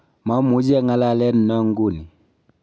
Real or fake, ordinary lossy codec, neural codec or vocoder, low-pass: real; none; none; none